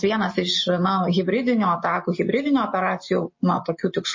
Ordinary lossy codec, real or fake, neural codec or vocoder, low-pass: MP3, 32 kbps; real; none; 7.2 kHz